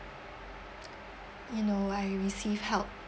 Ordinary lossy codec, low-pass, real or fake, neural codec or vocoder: none; none; real; none